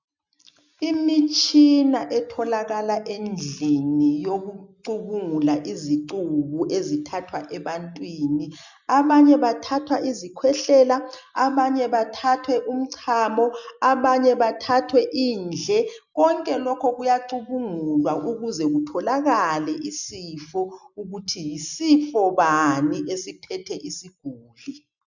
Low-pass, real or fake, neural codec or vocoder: 7.2 kHz; real; none